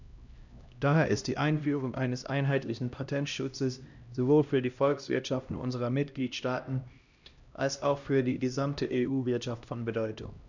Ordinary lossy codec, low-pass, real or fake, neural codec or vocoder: none; 7.2 kHz; fake; codec, 16 kHz, 1 kbps, X-Codec, HuBERT features, trained on LibriSpeech